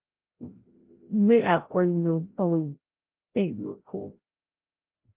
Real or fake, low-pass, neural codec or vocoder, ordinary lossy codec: fake; 3.6 kHz; codec, 16 kHz, 0.5 kbps, FreqCodec, larger model; Opus, 16 kbps